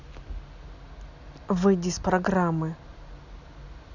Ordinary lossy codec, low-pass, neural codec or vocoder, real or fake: none; 7.2 kHz; none; real